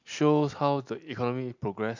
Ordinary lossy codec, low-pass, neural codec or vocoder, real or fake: MP3, 64 kbps; 7.2 kHz; none; real